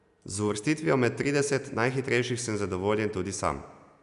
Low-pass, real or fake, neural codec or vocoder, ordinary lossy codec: 10.8 kHz; real; none; none